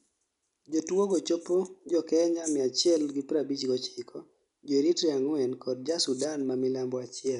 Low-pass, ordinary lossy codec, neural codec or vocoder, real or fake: 10.8 kHz; none; none; real